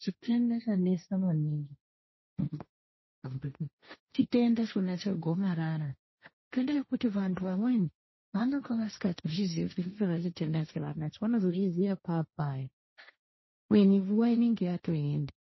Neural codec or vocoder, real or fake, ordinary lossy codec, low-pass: codec, 16 kHz, 1.1 kbps, Voila-Tokenizer; fake; MP3, 24 kbps; 7.2 kHz